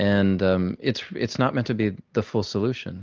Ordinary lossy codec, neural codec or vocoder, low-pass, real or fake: Opus, 24 kbps; none; 7.2 kHz; real